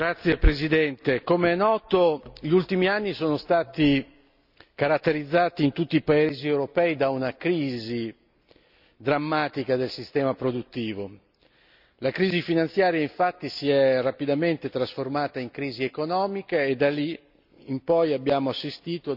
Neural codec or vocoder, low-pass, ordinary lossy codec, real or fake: none; 5.4 kHz; none; real